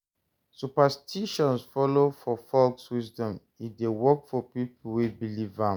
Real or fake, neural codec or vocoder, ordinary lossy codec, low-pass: real; none; none; none